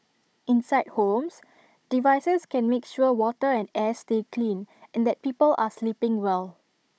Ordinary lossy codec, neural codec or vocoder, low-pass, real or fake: none; codec, 16 kHz, 16 kbps, FunCodec, trained on Chinese and English, 50 frames a second; none; fake